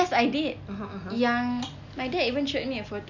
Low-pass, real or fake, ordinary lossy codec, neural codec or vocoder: 7.2 kHz; real; none; none